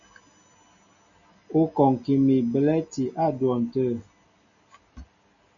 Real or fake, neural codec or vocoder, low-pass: real; none; 7.2 kHz